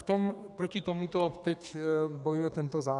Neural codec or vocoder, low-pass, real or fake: codec, 32 kHz, 1.9 kbps, SNAC; 10.8 kHz; fake